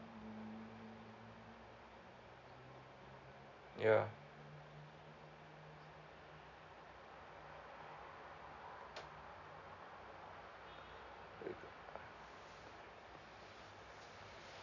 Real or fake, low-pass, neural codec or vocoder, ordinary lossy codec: real; 7.2 kHz; none; none